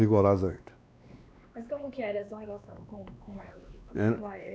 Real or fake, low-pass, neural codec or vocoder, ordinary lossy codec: fake; none; codec, 16 kHz, 2 kbps, X-Codec, WavLM features, trained on Multilingual LibriSpeech; none